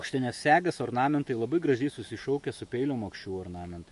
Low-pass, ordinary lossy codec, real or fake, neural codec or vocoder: 14.4 kHz; MP3, 48 kbps; fake; autoencoder, 48 kHz, 128 numbers a frame, DAC-VAE, trained on Japanese speech